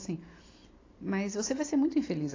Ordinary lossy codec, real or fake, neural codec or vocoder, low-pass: AAC, 32 kbps; real; none; 7.2 kHz